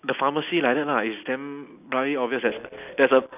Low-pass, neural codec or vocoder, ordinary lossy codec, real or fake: 3.6 kHz; none; none; real